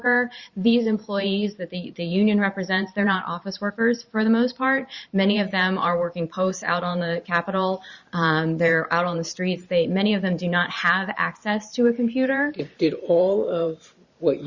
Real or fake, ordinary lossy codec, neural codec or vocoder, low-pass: fake; Opus, 64 kbps; vocoder, 44.1 kHz, 128 mel bands every 512 samples, BigVGAN v2; 7.2 kHz